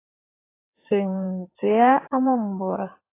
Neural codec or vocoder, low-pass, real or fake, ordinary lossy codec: codec, 16 kHz in and 24 kHz out, 2.2 kbps, FireRedTTS-2 codec; 3.6 kHz; fake; AAC, 24 kbps